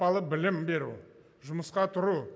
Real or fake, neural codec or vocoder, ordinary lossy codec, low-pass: real; none; none; none